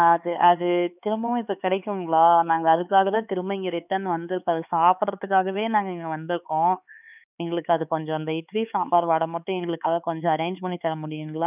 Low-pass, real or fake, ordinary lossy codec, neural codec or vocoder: 3.6 kHz; fake; none; codec, 16 kHz, 4 kbps, X-Codec, HuBERT features, trained on LibriSpeech